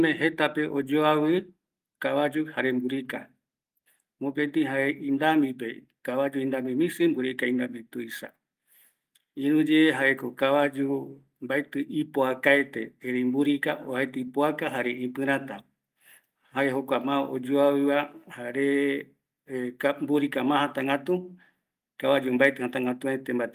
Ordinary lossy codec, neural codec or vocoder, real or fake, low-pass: Opus, 32 kbps; none; real; 14.4 kHz